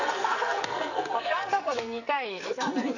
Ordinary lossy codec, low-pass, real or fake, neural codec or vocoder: none; 7.2 kHz; fake; codec, 44.1 kHz, 2.6 kbps, SNAC